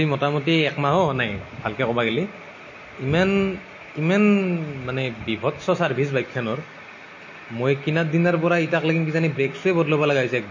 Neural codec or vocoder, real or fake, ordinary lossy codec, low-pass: none; real; MP3, 32 kbps; 7.2 kHz